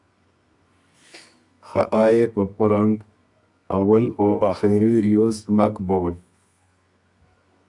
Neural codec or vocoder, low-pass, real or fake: codec, 24 kHz, 0.9 kbps, WavTokenizer, medium music audio release; 10.8 kHz; fake